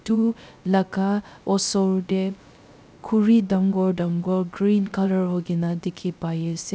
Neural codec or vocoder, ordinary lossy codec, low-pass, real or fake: codec, 16 kHz, 0.3 kbps, FocalCodec; none; none; fake